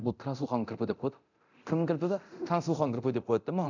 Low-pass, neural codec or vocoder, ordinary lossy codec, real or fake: 7.2 kHz; codec, 24 kHz, 0.9 kbps, DualCodec; none; fake